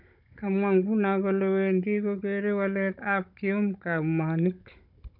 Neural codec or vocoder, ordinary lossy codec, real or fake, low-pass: codec, 16 kHz, 16 kbps, FunCodec, trained on Chinese and English, 50 frames a second; none; fake; 5.4 kHz